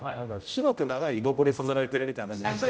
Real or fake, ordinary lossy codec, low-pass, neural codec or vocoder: fake; none; none; codec, 16 kHz, 0.5 kbps, X-Codec, HuBERT features, trained on general audio